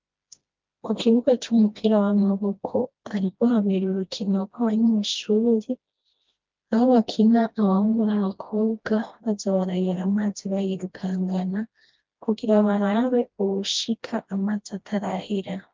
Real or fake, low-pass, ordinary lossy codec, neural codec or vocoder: fake; 7.2 kHz; Opus, 32 kbps; codec, 16 kHz, 1 kbps, FreqCodec, smaller model